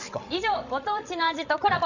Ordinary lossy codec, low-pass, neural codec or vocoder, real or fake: none; 7.2 kHz; codec, 16 kHz, 16 kbps, FreqCodec, larger model; fake